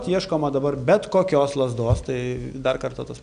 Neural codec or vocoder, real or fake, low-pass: none; real; 9.9 kHz